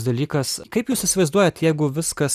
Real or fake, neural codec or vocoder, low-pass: real; none; 14.4 kHz